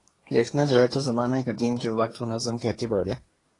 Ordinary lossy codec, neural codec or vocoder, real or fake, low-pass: AAC, 32 kbps; codec, 24 kHz, 1 kbps, SNAC; fake; 10.8 kHz